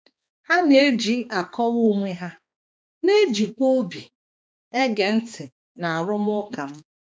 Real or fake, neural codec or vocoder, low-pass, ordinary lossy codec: fake; codec, 16 kHz, 2 kbps, X-Codec, HuBERT features, trained on balanced general audio; none; none